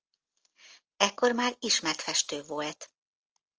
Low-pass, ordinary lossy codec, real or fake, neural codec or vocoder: 7.2 kHz; Opus, 24 kbps; real; none